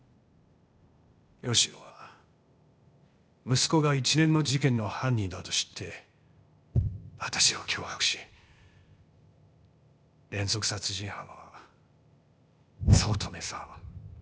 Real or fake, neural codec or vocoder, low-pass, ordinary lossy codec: fake; codec, 16 kHz, 0.8 kbps, ZipCodec; none; none